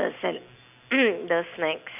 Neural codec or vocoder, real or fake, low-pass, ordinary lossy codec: none; real; 3.6 kHz; none